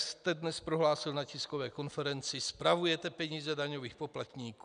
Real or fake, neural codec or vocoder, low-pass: real; none; 9.9 kHz